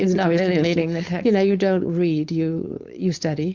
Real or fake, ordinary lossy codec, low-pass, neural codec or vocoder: fake; Opus, 64 kbps; 7.2 kHz; codec, 16 kHz, 4.8 kbps, FACodec